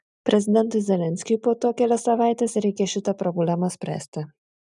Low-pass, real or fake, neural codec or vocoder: 10.8 kHz; real; none